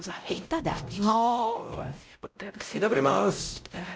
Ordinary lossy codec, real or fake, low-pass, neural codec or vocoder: none; fake; none; codec, 16 kHz, 0.5 kbps, X-Codec, WavLM features, trained on Multilingual LibriSpeech